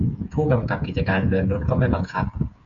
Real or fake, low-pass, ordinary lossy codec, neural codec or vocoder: fake; 7.2 kHz; AAC, 64 kbps; codec, 16 kHz, 8 kbps, FreqCodec, smaller model